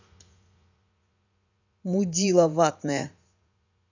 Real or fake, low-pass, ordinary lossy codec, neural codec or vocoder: fake; 7.2 kHz; none; vocoder, 44.1 kHz, 80 mel bands, Vocos